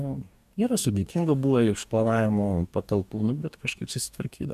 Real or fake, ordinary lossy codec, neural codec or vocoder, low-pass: fake; MP3, 96 kbps; codec, 44.1 kHz, 2.6 kbps, DAC; 14.4 kHz